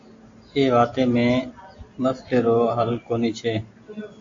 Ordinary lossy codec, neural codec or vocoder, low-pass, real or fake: AAC, 48 kbps; none; 7.2 kHz; real